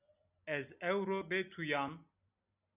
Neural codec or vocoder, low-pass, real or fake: none; 3.6 kHz; real